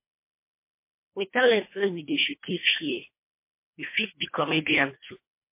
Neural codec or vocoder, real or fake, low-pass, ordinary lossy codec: codec, 24 kHz, 1.5 kbps, HILCodec; fake; 3.6 kHz; MP3, 24 kbps